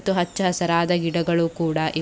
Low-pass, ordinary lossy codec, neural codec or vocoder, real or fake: none; none; none; real